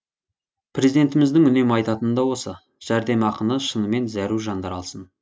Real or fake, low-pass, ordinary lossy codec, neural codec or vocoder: real; none; none; none